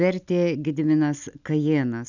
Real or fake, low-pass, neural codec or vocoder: real; 7.2 kHz; none